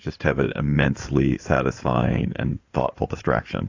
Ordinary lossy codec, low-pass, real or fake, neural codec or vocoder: AAC, 48 kbps; 7.2 kHz; fake; codec, 16 kHz in and 24 kHz out, 2.2 kbps, FireRedTTS-2 codec